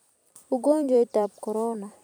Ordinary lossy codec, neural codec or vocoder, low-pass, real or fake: none; vocoder, 44.1 kHz, 128 mel bands every 256 samples, BigVGAN v2; none; fake